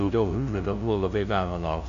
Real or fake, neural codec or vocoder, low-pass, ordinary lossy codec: fake; codec, 16 kHz, 0.5 kbps, FunCodec, trained on LibriTTS, 25 frames a second; 7.2 kHz; AAC, 96 kbps